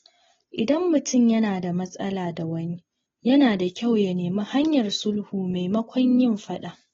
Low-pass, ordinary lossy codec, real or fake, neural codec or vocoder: 7.2 kHz; AAC, 24 kbps; real; none